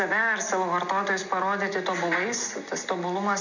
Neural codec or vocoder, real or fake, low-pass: none; real; 7.2 kHz